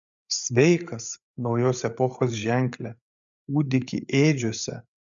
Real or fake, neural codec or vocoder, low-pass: fake; codec, 16 kHz, 8 kbps, FreqCodec, larger model; 7.2 kHz